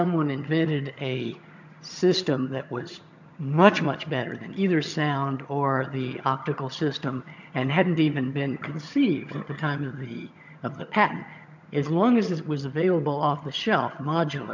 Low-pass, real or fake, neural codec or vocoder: 7.2 kHz; fake; vocoder, 22.05 kHz, 80 mel bands, HiFi-GAN